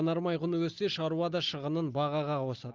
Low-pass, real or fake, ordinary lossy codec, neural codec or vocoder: 7.2 kHz; real; Opus, 32 kbps; none